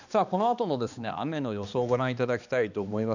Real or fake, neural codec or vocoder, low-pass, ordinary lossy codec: fake; codec, 16 kHz, 2 kbps, X-Codec, HuBERT features, trained on balanced general audio; 7.2 kHz; none